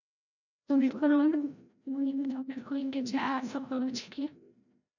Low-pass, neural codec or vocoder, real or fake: 7.2 kHz; codec, 16 kHz, 0.5 kbps, FreqCodec, larger model; fake